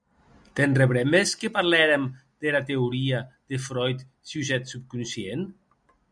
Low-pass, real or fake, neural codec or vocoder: 9.9 kHz; real; none